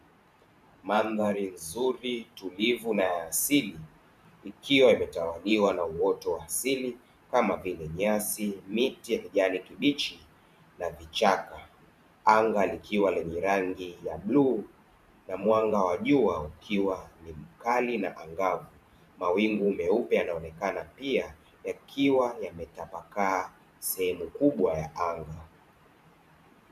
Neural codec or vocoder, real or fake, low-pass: vocoder, 44.1 kHz, 128 mel bands every 512 samples, BigVGAN v2; fake; 14.4 kHz